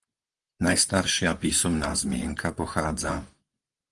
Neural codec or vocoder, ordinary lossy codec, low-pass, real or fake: vocoder, 44.1 kHz, 128 mel bands, Pupu-Vocoder; Opus, 32 kbps; 10.8 kHz; fake